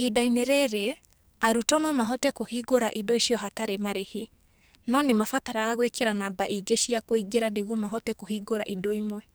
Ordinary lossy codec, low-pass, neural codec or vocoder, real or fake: none; none; codec, 44.1 kHz, 2.6 kbps, SNAC; fake